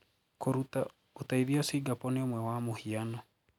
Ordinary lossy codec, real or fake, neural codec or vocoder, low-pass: none; real; none; 19.8 kHz